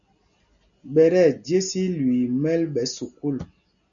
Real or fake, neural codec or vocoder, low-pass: real; none; 7.2 kHz